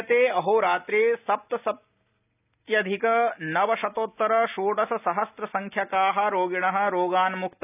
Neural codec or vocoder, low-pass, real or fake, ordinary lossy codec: none; 3.6 kHz; real; none